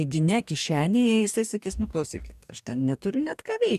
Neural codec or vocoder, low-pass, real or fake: codec, 44.1 kHz, 2.6 kbps, DAC; 14.4 kHz; fake